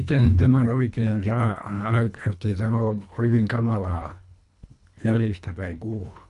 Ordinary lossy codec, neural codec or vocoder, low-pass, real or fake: none; codec, 24 kHz, 1.5 kbps, HILCodec; 10.8 kHz; fake